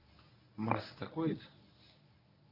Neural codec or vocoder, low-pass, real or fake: codec, 24 kHz, 0.9 kbps, WavTokenizer, medium speech release version 1; 5.4 kHz; fake